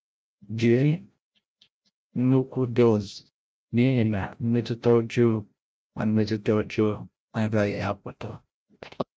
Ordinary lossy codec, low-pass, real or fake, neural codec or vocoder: none; none; fake; codec, 16 kHz, 0.5 kbps, FreqCodec, larger model